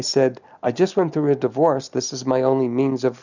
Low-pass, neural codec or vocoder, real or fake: 7.2 kHz; none; real